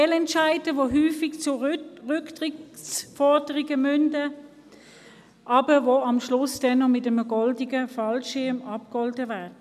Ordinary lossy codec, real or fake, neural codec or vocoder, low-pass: none; real; none; 14.4 kHz